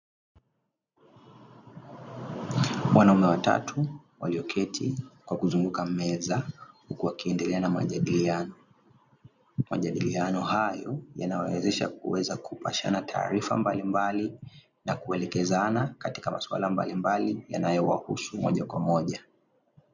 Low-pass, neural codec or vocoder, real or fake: 7.2 kHz; none; real